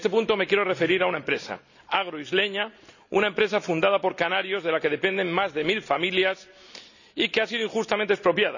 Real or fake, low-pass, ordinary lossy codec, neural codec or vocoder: real; 7.2 kHz; none; none